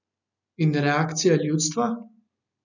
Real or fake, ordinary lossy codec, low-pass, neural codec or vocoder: real; none; 7.2 kHz; none